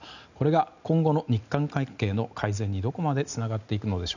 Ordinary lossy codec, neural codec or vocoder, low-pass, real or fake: none; none; 7.2 kHz; real